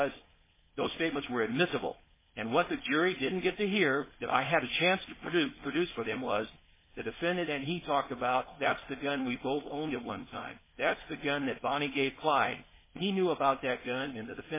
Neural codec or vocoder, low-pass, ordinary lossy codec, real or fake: codec, 16 kHz, 4.8 kbps, FACodec; 3.6 kHz; MP3, 16 kbps; fake